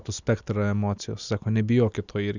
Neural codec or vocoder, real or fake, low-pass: none; real; 7.2 kHz